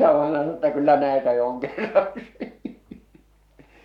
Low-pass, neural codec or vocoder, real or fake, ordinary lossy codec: 19.8 kHz; codec, 44.1 kHz, 7.8 kbps, Pupu-Codec; fake; none